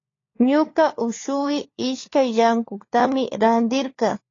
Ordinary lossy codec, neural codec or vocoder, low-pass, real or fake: AAC, 32 kbps; codec, 16 kHz, 4 kbps, FunCodec, trained on LibriTTS, 50 frames a second; 7.2 kHz; fake